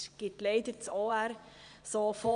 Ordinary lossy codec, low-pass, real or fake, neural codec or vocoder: none; 9.9 kHz; fake; vocoder, 22.05 kHz, 80 mel bands, Vocos